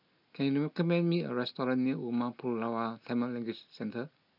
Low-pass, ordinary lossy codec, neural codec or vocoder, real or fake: 5.4 kHz; none; none; real